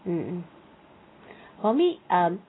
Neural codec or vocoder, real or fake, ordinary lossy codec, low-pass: none; real; AAC, 16 kbps; 7.2 kHz